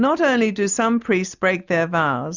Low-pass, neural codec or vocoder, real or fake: 7.2 kHz; none; real